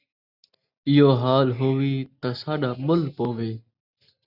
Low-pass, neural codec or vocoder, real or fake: 5.4 kHz; none; real